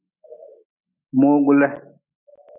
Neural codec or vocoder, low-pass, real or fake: none; 3.6 kHz; real